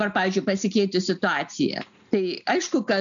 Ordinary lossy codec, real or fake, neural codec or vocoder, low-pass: MP3, 96 kbps; real; none; 7.2 kHz